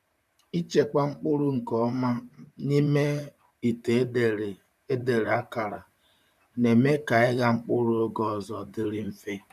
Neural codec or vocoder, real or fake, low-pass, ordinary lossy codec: vocoder, 44.1 kHz, 128 mel bands, Pupu-Vocoder; fake; 14.4 kHz; none